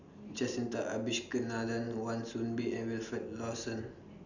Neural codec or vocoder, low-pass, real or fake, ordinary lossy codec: none; 7.2 kHz; real; none